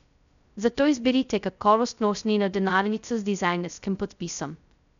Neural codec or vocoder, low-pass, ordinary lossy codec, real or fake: codec, 16 kHz, 0.2 kbps, FocalCodec; 7.2 kHz; none; fake